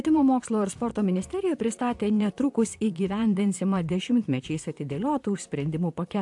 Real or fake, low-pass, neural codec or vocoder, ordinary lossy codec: fake; 10.8 kHz; vocoder, 44.1 kHz, 128 mel bands, Pupu-Vocoder; AAC, 64 kbps